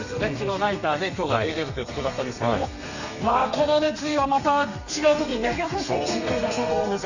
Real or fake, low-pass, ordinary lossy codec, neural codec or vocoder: fake; 7.2 kHz; none; codec, 32 kHz, 1.9 kbps, SNAC